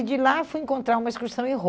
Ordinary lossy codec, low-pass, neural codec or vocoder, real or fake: none; none; none; real